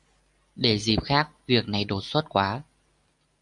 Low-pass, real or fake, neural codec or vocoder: 10.8 kHz; real; none